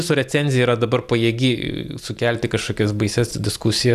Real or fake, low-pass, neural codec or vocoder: real; 14.4 kHz; none